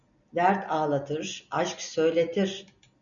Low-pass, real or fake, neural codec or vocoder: 7.2 kHz; real; none